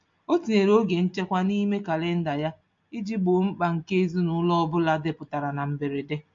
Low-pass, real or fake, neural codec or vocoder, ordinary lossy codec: 7.2 kHz; real; none; MP3, 48 kbps